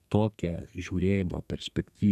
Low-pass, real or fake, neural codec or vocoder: 14.4 kHz; fake; codec, 32 kHz, 1.9 kbps, SNAC